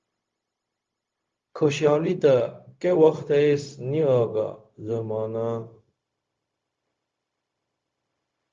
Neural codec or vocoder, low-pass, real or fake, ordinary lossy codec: codec, 16 kHz, 0.4 kbps, LongCat-Audio-Codec; 7.2 kHz; fake; Opus, 32 kbps